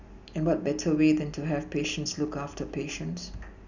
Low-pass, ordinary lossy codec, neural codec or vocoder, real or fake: 7.2 kHz; none; none; real